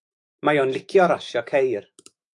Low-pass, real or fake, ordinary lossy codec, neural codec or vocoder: 10.8 kHz; fake; AAC, 64 kbps; autoencoder, 48 kHz, 128 numbers a frame, DAC-VAE, trained on Japanese speech